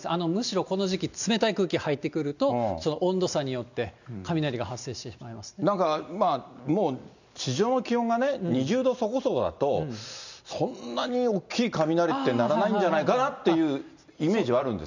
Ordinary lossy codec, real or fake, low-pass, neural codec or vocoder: none; real; 7.2 kHz; none